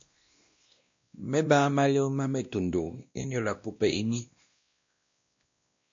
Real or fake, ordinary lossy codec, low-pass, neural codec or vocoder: fake; MP3, 48 kbps; 7.2 kHz; codec, 16 kHz, 1 kbps, X-Codec, WavLM features, trained on Multilingual LibriSpeech